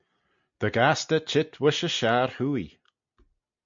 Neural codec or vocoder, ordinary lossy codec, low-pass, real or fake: none; MP3, 48 kbps; 7.2 kHz; real